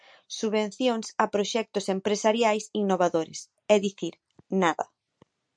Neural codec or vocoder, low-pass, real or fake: none; 9.9 kHz; real